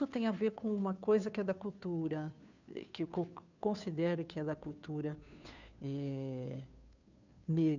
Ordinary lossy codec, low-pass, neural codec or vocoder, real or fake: none; 7.2 kHz; codec, 16 kHz, 2 kbps, FunCodec, trained on Chinese and English, 25 frames a second; fake